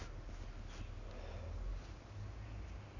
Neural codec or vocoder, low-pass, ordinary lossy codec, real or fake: none; 7.2 kHz; none; real